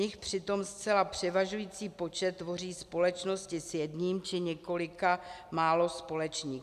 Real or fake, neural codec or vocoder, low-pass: real; none; 14.4 kHz